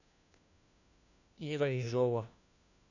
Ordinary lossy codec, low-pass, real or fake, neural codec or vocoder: none; 7.2 kHz; fake; codec, 16 kHz, 1 kbps, FunCodec, trained on LibriTTS, 50 frames a second